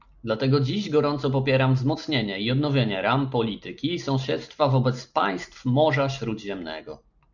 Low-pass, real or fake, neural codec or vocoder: 7.2 kHz; real; none